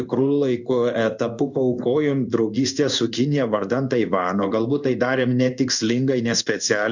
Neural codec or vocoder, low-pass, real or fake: codec, 16 kHz in and 24 kHz out, 1 kbps, XY-Tokenizer; 7.2 kHz; fake